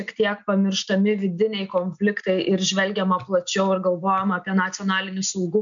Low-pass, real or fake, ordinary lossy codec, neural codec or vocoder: 7.2 kHz; real; MP3, 64 kbps; none